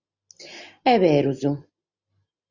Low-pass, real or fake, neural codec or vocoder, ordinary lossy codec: 7.2 kHz; real; none; Opus, 64 kbps